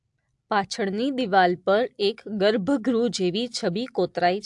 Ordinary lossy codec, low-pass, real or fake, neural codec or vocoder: AAC, 64 kbps; 10.8 kHz; real; none